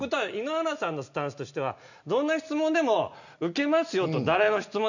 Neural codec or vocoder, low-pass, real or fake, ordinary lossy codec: none; 7.2 kHz; real; none